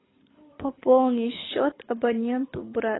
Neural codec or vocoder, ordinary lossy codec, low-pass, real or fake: codec, 24 kHz, 6 kbps, HILCodec; AAC, 16 kbps; 7.2 kHz; fake